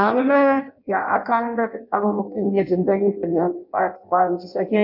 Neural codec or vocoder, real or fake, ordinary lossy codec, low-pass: codec, 16 kHz in and 24 kHz out, 0.6 kbps, FireRedTTS-2 codec; fake; none; 5.4 kHz